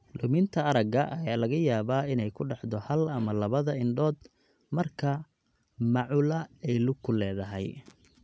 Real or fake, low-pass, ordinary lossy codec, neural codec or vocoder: real; none; none; none